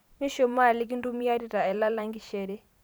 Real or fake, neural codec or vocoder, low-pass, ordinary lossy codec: fake; vocoder, 44.1 kHz, 128 mel bands every 512 samples, BigVGAN v2; none; none